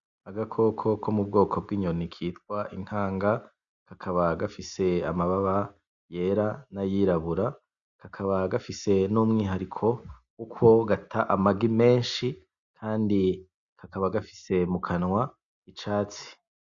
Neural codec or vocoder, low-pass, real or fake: none; 7.2 kHz; real